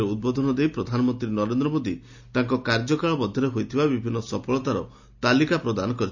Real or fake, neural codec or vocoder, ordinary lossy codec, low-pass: real; none; none; 7.2 kHz